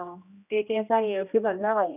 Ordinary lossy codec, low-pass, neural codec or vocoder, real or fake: none; 3.6 kHz; codec, 16 kHz, 1 kbps, X-Codec, HuBERT features, trained on general audio; fake